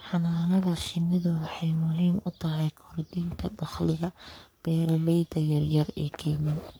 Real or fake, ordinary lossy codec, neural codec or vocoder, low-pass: fake; none; codec, 44.1 kHz, 3.4 kbps, Pupu-Codec; none